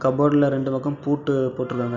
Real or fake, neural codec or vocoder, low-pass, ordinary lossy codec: real; none; 7.2 kHz; none